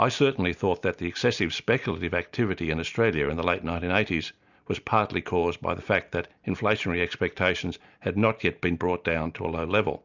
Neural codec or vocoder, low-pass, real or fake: none; 7.2 kHz; real